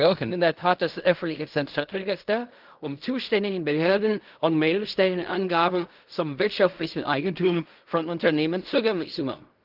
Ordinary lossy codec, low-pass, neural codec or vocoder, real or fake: Opus, 24 kbps; 5.4 kHz; codec, 16 kHz in and 24 kHz out, 0.4 kbps, LongCat-Audio-Codec, fine tuned four codebook decoder; fake